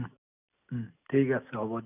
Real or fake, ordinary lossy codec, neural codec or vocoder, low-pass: real; none; none; 3.6 kHz